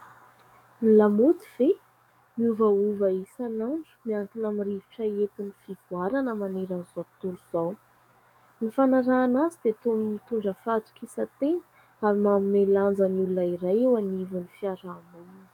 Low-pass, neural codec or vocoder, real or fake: 19.8 kHz; codec, 44.1 kHz, 7.8 kbps, DAC; fake